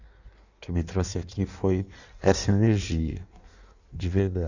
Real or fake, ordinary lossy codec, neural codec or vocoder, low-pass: fake; none; codec, 16 kHz in and 24 kHz out, 1.1 kbps, FireRedTTS-2 codec; 7.2 kHz